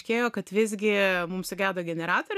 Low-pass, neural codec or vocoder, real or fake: 14.4 kHz; none; real